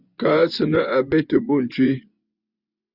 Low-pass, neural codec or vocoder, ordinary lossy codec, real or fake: 5.4 kHz; vocoder, 24 kHz, 100 mel bands, Vocos; Opus, 64 kbps; fake